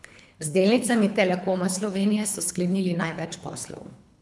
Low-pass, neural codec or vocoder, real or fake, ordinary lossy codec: none; codec, 24 kHz, 3 kbps, HILCodec; fake; none